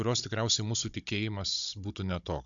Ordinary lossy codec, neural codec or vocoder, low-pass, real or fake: MP3, 64 kbps; codec, 16 kHz, 16 kbps, FunCodec, trained on Chinese and English, 50 frames a second; 7.2 kHz; fake